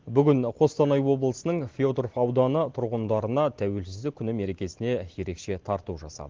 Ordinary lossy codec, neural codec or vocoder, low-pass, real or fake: Opus, 16 kbps; none; 7.2 kHz; real